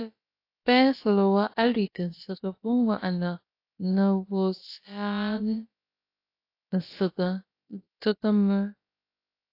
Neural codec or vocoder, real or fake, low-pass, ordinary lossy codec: codec, 16 kHz, about 1 kbps, DyCAST, with the encoder's durations; fake; 5.4 kHz; AAC, 32 kbps